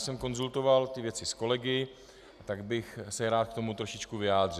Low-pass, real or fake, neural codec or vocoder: 14.4 kHz; real; none